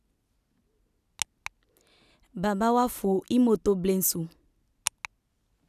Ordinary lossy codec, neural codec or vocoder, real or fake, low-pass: none; none; real; 14.4 kHz